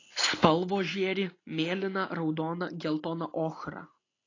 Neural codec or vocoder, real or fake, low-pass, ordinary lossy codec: none; real; 7.2 kHz; AAC, 32 kbps